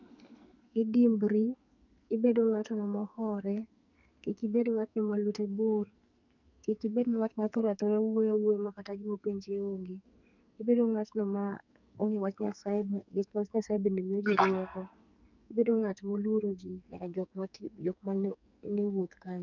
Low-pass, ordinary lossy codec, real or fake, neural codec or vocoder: 7.2 kHz; none; fake; codec, 44.1 kHz, 2.6 kbps, SNAC